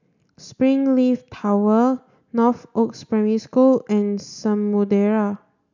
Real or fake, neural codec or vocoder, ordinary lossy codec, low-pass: real; none; none; 7.2 kHz